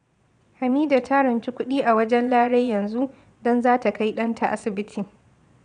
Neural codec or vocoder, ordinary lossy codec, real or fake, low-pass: vocoder, 22.05 kHz, 80 mel bands, Vocos; none; fake; 9.9 kHz